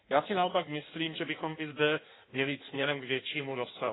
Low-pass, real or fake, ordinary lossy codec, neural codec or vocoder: 7.2 kHz; fake; AAC, 16 kbps; codec, 16 kHz in and 24 kHz out, 1.1 kbps, FireRedTTS-2 codec